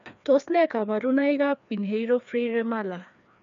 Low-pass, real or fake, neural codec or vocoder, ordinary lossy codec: 7.2 kHz; fake; codec, 16 kHz, 2 kbps, FreqCodec, larger model; none